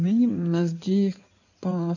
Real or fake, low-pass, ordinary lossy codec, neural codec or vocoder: fake; 7.2 kHz; none; codec, 16 kHz in and 24 kHz out, 2.2 kbps, FireRedTTS-2 codec